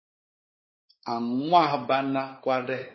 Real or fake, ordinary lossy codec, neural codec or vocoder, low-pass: fake; MP3, 24 kbps; codec, 16 kHz, 4 kbps, X-Codec, WavLM features, trained on Multilingual LibriSpeech; 7.2 kHz